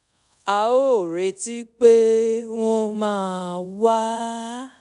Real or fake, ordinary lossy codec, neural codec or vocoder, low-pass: fake; none; codec, 24 kHz, 0.5 kbps, DualCodec; 10.8 kHz